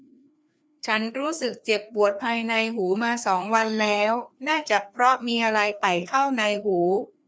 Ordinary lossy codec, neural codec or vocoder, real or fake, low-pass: none; codec, 16 kHz, 2 kbps, FreqCodec, larger model; fake; none